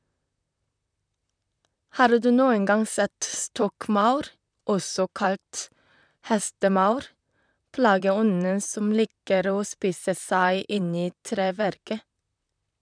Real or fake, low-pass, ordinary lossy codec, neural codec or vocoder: fake; 9.9 kHz; none; vocoder, 44.1 kHz, 128 mel bands, Pupu-Vocoder